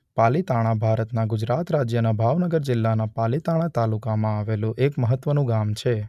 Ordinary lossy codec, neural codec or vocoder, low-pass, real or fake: none; none; 14.4 kHz; real